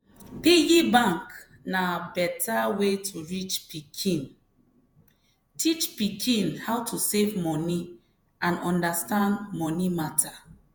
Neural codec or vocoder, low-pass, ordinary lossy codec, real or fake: vocoder, 48 kHz, 128 mel bands, Vocos; none; none; fake